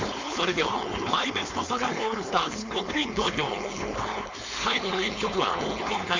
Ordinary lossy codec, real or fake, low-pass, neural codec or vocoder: MP3, 48 kbps; fake; 7.2 kHz; codec, 16 kHz, 4.8 kbps, FACodec